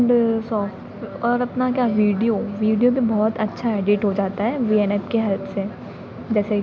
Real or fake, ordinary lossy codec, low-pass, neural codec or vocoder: real; none; none; none